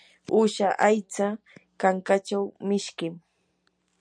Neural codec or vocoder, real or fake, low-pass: none; real; 9.9 kHz